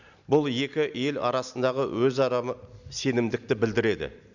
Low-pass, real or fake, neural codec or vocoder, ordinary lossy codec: 7.2 kHz; real; none; none